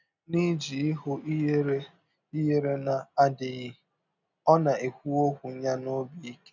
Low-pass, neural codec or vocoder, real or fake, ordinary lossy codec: 7.2 kHz; none; real; none